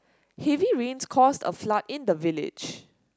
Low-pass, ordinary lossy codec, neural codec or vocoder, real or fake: none; none; none; real